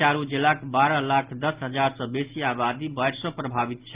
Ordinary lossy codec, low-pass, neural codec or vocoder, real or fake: Opus, 32 kbps; 3.6 kHz; none; real